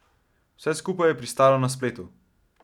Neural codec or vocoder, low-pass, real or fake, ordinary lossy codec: none; 19.8 kHz; real; none